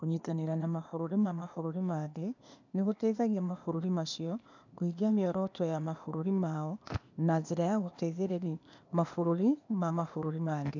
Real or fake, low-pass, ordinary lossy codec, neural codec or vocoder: fake; 7.2 kHz; none; codec, 16 kHz, 0.8 kbps, ZipCodec